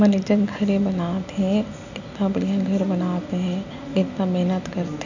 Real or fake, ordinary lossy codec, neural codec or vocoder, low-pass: real; none; none; 7.2 kHz